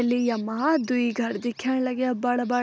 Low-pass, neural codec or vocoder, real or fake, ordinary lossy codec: none; none; real; none